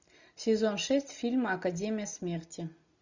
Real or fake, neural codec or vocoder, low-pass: real; none; 7.2 kHz